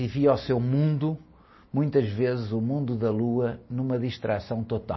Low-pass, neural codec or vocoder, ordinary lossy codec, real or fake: 7.2 kHz; none; MP3, 24 kbps; real